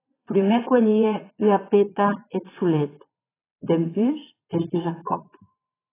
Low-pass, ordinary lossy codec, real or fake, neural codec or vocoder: 3.6 kHz; AAC, 16 kbps; fake; vocoder, 22.05 kHz, 80 mel bands, Vocos